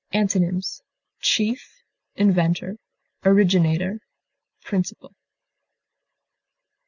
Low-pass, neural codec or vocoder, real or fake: 7.2 kHz; none; real